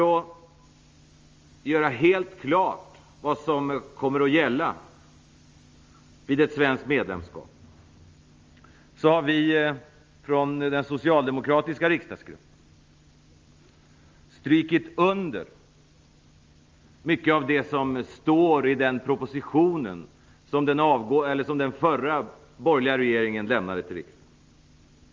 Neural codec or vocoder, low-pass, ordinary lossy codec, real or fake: none; 7.2 kHz; Opus, 32 kbps; real